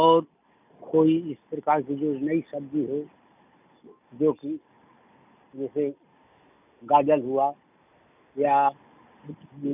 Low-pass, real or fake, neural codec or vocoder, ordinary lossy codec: 3.6 kHz; real; none; none